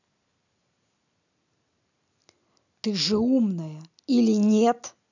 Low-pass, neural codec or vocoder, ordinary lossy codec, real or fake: 7.2 kHz; none; none; real